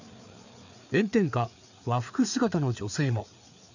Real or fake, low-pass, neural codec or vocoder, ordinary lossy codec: fake; 7.2 kHz; codec, 16 kHz, 4 kbps, FreqCodec, larger model; none